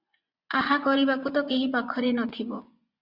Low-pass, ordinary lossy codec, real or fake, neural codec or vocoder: 5.4 kHz; Opus, 64 kbps; fake; vocoder, 24 kHz, 100 mel bands, Vocos